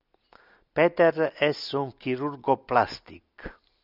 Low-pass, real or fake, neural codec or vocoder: 5.4 kHz; real; none